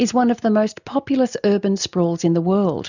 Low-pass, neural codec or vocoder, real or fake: 7.2 kHz; none; real